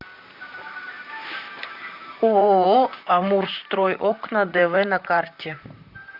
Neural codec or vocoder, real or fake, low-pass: vocoder, 44.1 kHz, 128 mel bands, Pupu-Vocoder; fake; 5.4 kHz